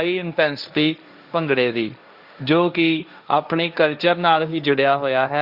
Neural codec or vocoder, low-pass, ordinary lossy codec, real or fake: codec, 16 kHz, 1.1 kbps, Voila-Tokenizer; 5.4 kHz; Opus, 64 kbps; fake